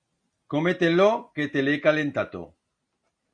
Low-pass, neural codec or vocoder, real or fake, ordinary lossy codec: 9.9 kHz; none; real; Opus, 64 kbps